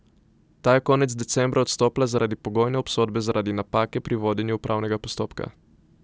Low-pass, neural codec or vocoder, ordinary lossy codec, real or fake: none; none; none; real